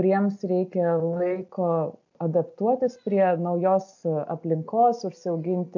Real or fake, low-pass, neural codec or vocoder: real; 7.2 kHz; none